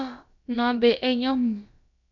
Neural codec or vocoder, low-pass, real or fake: codec, 16 kHz, about 1 kbps, DyCAST, with the encoder's durations; 7.2 kHz; fake